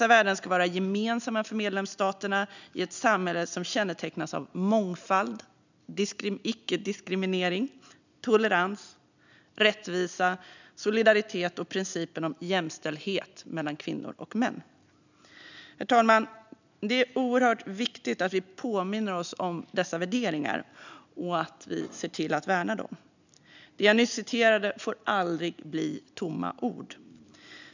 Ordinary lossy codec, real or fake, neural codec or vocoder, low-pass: none; real; none; 7.2 kHz